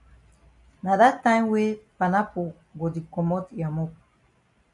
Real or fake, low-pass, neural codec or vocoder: real; 10.8 kHz; none